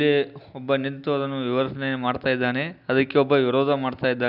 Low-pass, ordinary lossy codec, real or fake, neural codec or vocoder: 5.4 kHz; none; real; none